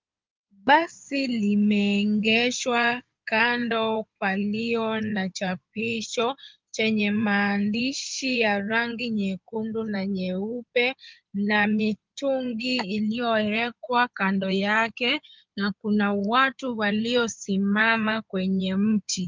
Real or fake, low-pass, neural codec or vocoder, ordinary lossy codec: fake; 7.2 kHz; codec, 16 kHz in and 24 kHz out, 2.2 kbps, FireRedTTS-2 codec; Opus, 32 kbps